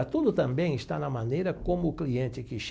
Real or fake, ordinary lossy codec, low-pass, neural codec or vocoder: real; none; none; none